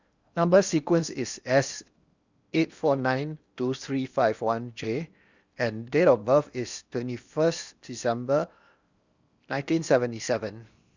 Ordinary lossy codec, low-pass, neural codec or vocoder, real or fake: Opus, 64 kbps; 7.2 kHz; codec, 16 kHz in and 24 kHz out, 0.8 kbps, FocalCodec, streaming, 65536 codes; fake